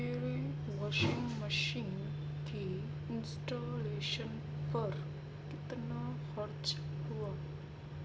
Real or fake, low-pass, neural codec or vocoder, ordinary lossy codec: real; none; none; none